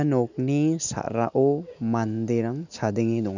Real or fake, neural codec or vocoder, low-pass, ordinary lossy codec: real; none; 7.2 kHz; none